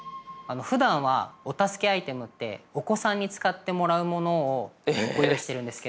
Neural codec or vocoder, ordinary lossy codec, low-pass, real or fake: none; none; none; real